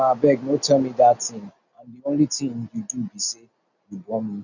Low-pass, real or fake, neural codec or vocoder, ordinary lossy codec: 7.2 kHz; real; none; none